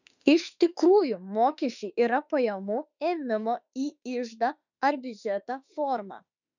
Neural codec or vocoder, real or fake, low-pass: autoencoder, 48 kHz, 32 numbers a frame, DAC-VAE, trained on Japanese speech; fake; 7.2 kHz